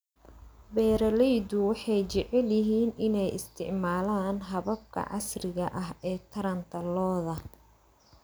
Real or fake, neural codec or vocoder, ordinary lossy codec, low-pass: real; none; none; none